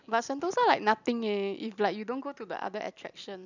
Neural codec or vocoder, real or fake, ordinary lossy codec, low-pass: none; real; none; 7.2 kHz